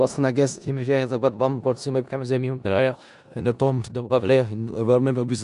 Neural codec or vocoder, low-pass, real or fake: codec, 16 kHz in and 24 kHz out, 0.4 kbps, LongCat-Audio-Codec, four codebook decoder; 10.8 kHz; fake